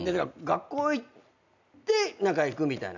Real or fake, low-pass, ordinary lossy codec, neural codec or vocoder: real; 7.2 kHz; none; none